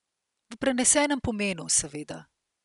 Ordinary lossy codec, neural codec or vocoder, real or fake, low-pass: none; none; real; 10.8 kHz